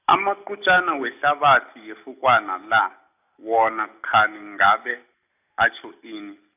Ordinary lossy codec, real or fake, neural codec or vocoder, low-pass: none; real; none; 3.6 kHz